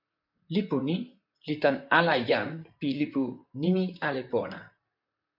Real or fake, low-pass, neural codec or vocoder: fake; 5.4 kHz; vocoder, 44.1 kHz, 128 mel bands, Pupu-Vocoder